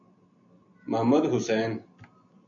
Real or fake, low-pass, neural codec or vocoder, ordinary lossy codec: real; 7.2 kHz; none; AAC, 48 kbps